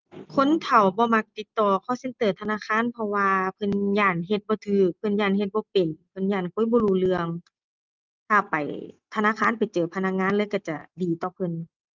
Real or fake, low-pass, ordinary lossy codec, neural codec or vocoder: real; 7.2 kHz; Opus, 32 kbps; none